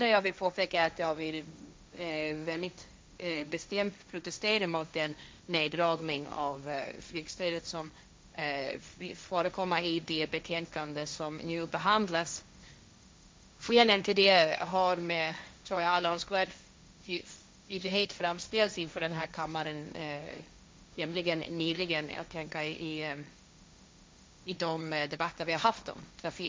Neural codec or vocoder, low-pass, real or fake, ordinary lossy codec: codec, 16 kHz, 1.1 kbps, Voila-Tokenizer; none; fake; none